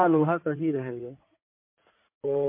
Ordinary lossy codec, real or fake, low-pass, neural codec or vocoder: MP3, 32 kbps; fake; 3.6 kHz; codec, 16 kHz in and 24 kHz out, 2.2 kbps, FireRedTTS-2 codec